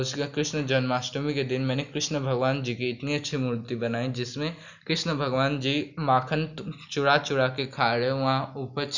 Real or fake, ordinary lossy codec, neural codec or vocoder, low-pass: real; none; none; 7.2 kHz